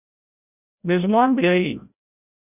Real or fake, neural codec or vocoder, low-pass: fake; codec, 16 kHz, 0.5 kbps, FreqCodec, larger model; 3.6 kHz